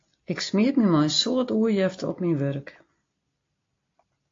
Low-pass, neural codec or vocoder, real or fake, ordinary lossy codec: 7.2 kHz; none; real; AAC, 32 kbps